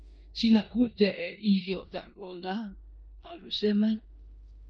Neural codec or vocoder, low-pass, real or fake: codec, 16 kHz in and 24 kHz out, 0.9 kbps, LongCat-Audio-Codec, four codebook decoder; 9.9 kHz; fake